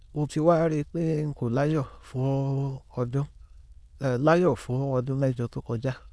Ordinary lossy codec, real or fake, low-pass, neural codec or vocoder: none; fake; none; autoencoder, 22.05 kHz, a latent of 192 numbers a frame, VITS, trained on many speakers